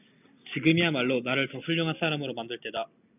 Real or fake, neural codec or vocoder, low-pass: real; none; 3.6 kHz